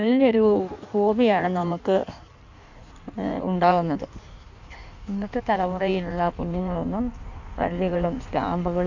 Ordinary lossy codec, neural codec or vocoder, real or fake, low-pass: none; codec, 16 kHz in and 24 kHz out, 1.1 kbps, FireRedTTS-2 codec; fake; 7.2 kHz